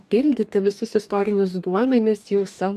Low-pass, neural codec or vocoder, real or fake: 14.4 kHz; codec, 44.1 kHz, 2.6 kbps, DAC; fake